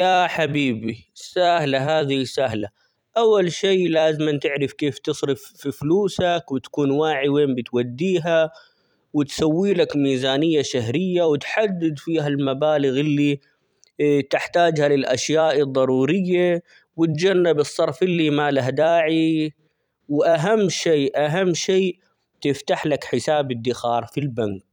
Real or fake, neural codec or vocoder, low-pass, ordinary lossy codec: fake; vocoder, 44.1 kHz, 128 mel bands every 256 samples, BigVGAN v2; 19.8 kHz; none